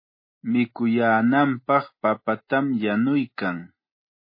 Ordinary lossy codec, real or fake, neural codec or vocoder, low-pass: MP3, 24 kbps; real; none; 5.4 kHz